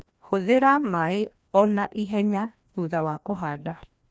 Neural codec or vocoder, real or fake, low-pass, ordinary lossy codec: codec, 16 kHz, 1 kbps, FreqCodec, larger model; fake; none; none